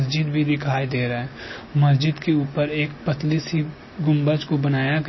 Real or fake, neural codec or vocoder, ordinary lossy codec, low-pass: real; none; MP3, 24 kbps; 7.2 kHz